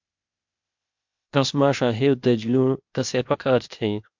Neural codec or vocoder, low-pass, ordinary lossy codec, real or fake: codec, 16 kHz, 0.8 kbps, ZipCodec; 7.2 kHz; MP3, 64 kbps; fake